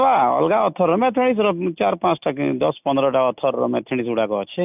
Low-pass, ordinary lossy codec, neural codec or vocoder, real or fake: 3.6 kHz; none; none; real